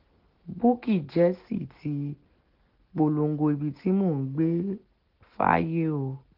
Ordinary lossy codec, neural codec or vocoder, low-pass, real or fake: Opus, 16 kbps; none; 5.4 kHz; real